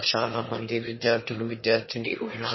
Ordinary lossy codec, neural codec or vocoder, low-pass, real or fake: MP3, 24 kbps; autoencoder, 22.05 kHz, a latent of 192 numbers a frame, VITS, trained on one speaker; 7.2 kHz; fake